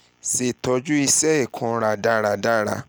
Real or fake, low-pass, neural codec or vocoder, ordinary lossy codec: real; none; none; none